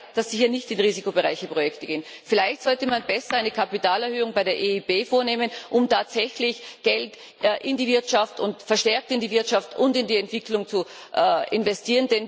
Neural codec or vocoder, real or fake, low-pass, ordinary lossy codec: none; real; none; none